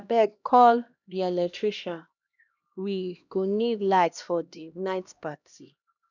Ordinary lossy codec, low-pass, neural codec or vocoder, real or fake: none; 7.2 kHz; codec, 16 kHz, 1 kbps, X-Codec, HuBERT features, trained on LibriSpeech; fake